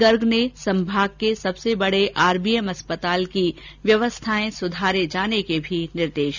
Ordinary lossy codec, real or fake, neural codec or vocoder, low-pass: none; real; none; 7.2 kHz